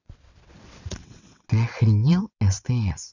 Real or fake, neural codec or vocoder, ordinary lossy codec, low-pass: fake; vocoder, 22.05 kHz, 80 mel bands, WaveNeXt; none; 7.2 kHz